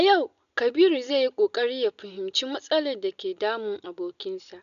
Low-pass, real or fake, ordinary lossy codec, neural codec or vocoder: 7.2 kHz; real; none; none